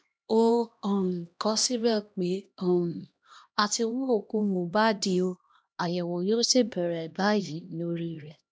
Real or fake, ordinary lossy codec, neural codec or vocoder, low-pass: fake; none; codec, 16 kHz, 1 kbps, X-Codec, HuBERT features, trained on LibriSpeech; none